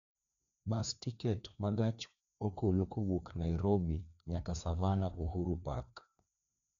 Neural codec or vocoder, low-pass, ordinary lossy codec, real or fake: codec, 16 kHz, 2 kbps, FreqCodec, larger model; 7.2 kHz; none; fake